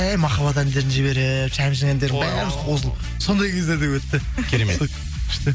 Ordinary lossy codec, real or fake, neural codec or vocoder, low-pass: none; real; none; none